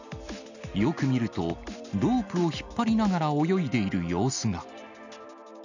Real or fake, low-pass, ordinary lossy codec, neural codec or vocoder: real; 7.2 kHz; none; none